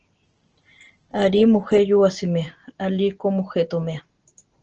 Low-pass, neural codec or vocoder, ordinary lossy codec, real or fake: 7.2 kHz; none; Opus, 16 kbps; real